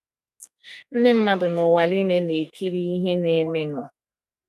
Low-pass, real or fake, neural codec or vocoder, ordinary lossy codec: 14.4 kHz; fake; codec, 44.1 kHz, 2.6 kbps, SNAC; none